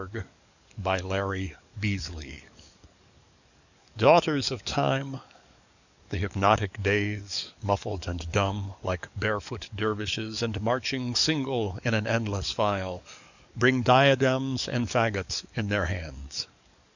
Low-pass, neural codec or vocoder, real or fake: 7.2 kHz; codec, 44.1 kHz, 7.8 kbps, DAC; fake